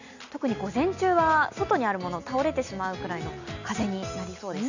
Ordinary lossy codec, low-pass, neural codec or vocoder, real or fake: none; 7.2 kHz; none; real